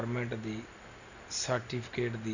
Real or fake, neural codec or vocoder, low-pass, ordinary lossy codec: real; none; 7.2 kHz; none